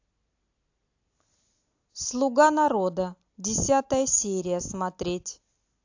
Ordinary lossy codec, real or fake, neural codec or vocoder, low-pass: AAC, 48 kbps; real; none; 7.2 kHz